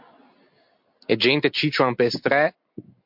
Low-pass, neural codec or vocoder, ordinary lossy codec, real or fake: 5.4 kHz; none; MP3, 48 kbps; real